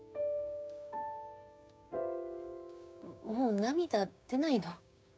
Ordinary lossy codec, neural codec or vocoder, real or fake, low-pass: none; codec, 16 kHz, 6 kbps, DAC; fake; none